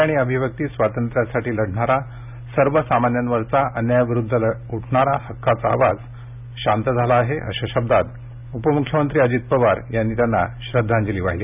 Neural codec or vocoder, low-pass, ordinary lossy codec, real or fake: none; 3.6 kHz; none; real